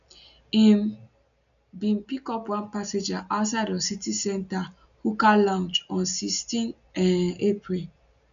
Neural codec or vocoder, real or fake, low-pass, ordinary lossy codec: none; real; 7.2 kHz; none